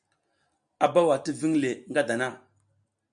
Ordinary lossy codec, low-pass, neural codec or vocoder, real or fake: MP3, 96 kbps; 9.9 kHz; none; real